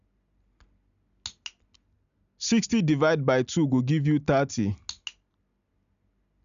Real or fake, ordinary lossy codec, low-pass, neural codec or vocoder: real; none; 7.2 kHz; none